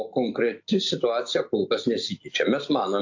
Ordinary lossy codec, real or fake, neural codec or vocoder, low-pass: AAC, 48 kbps; real; none; 7.2 kHz